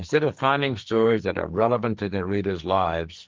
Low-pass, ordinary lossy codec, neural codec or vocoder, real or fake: 7.2 kHz; Opus, 32 kbps; codec, 44.1 kHz, 2.6 kbps, SNAC; fake